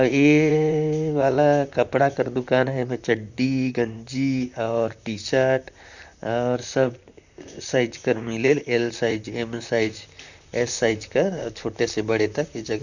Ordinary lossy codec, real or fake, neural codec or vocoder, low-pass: none; fake; vocoder, 44.1 kHz, 128 mel bands, Pupu-Vocoder; 7.2 kHz